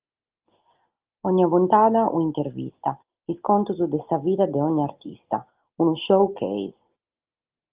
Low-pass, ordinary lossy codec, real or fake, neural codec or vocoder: 3.6 kHz; Opus, 32 kbps; real; none